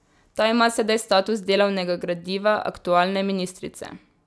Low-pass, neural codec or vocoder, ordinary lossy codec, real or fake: none; none; none; real